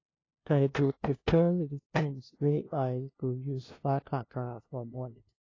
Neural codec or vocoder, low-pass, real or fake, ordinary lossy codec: codec, 16 kHz, 0.5 kbps, FunCodec, trained on LibriTTS, 25 frames a second; 7.2 kHz; fake; AAC, 32 kbps